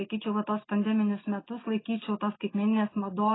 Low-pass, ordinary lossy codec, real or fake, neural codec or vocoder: 7.2 kHz; AAC, 16 kbps; real; none